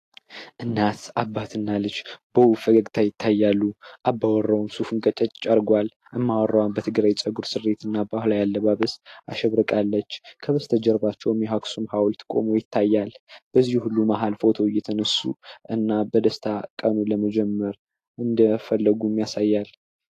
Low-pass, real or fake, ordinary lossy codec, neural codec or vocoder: 14.4 kHz; real; AAC, 48 kbps; none